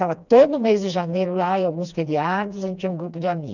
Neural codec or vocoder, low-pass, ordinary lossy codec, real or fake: codec, 16 kHz, 2 kbps, FreqCodec, smaller model; 7.2 kHz; none; fake